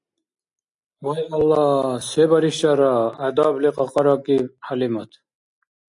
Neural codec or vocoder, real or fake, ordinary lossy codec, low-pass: none; real; AAC, 64 kbps; 10.8 kHz